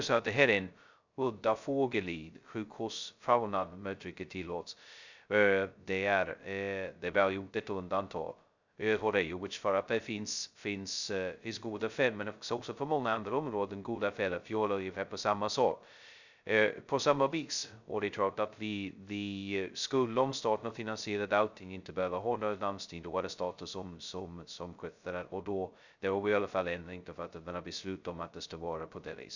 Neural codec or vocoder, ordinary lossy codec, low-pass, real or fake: codec, 16 kHz, 0.2 kbps, FocalCodec; none; 7.2 kHz; fake